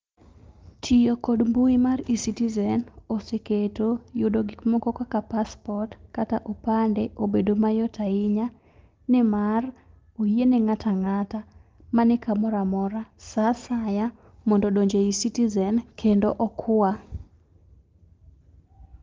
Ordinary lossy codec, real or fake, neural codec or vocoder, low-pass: Opus, 24 kbps; real; none; 7.2 kHz